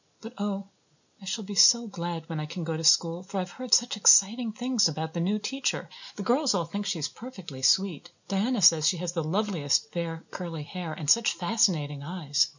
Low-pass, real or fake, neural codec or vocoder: 7.2 kHz; real; none